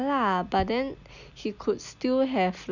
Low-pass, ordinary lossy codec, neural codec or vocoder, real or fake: 7.2 kHz; none; none; real